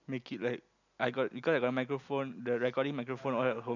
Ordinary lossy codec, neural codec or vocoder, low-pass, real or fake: none; none; 7.2 kHz; real